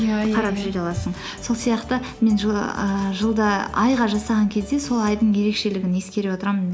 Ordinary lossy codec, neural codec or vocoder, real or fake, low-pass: none; none; real; none